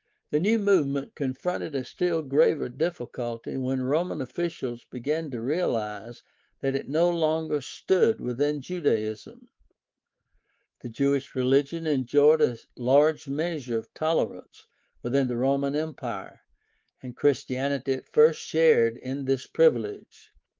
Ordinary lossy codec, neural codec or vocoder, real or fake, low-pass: Opus, 32 kbps; codec, 24 kHz, 3.1 kbps, DualCodec; fake; 7.2 kHz